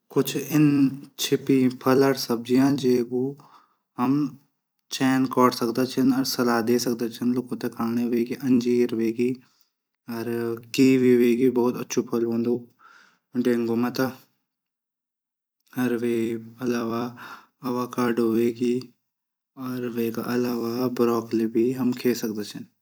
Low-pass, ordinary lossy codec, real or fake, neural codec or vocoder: none; none; fake; vocoder, 44.1 kHz, 128 mel bands every 256 samples, BigVGAN v2